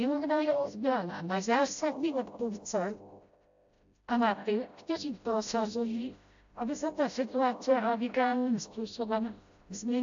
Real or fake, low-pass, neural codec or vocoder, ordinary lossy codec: fake; 7.2 kHz; codec, 16 kHz, 0.5 kbps, FreqCodec, smaller model; AAC, 64 kbps